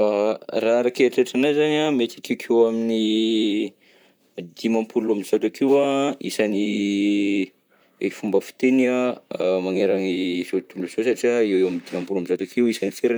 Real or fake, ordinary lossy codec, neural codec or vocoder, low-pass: fake; none; vocoder, 44.1 kHz, 128 mel bands, Pupu-Vocoder; none